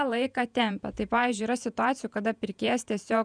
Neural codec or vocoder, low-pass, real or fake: vocoder, 48 kHz, 128 mel bands, Vocos; 9.9 kHz; fake